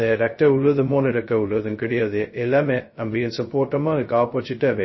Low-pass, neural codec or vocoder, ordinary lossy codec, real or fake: 7.2 kHz; codec, 16 kHz, 0.2 kbps, FocalCodec; MP3, 24 kbps; fake